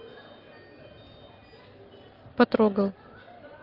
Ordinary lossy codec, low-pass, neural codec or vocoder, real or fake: Opus, 32 kbps; 5.4 kHz; none; real